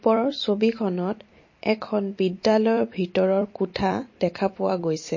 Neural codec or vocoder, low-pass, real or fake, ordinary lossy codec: vocoder, 44.1 kHz, 128 mel bands every 256 samples, BigVGAN v2; 7.2 kHz; fake; MP3, 32 kbps